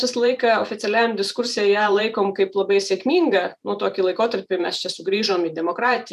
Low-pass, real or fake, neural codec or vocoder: 14.4 kHz; fake; vocoder, 44.1 kHz, 128 mel bands every 512 samples, BigVGAN v2